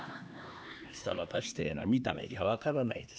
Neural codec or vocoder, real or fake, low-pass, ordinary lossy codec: codec, 16 kHz, 2 kbps, X-Codec, HuBERT features, trained on LibriSpeech; fake; none; none